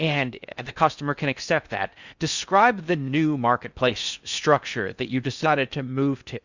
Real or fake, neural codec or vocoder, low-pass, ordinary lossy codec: fake; codec, 16 kHz in and 24 kHz out, 0.6 kbps, FocalCodec, streaming, 4096 codes; 7.2 kHz; Opus, 64 kbps